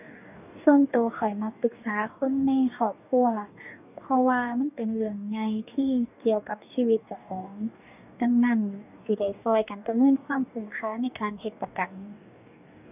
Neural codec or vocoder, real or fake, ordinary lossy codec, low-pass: codec, 44.1 kHz, 2.6 kbps, DAC; fake; none; 3.6 kHz